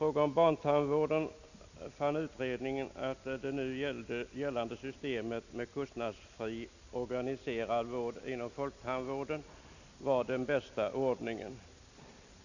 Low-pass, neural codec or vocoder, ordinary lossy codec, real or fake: 7.2 kHz; none; none; real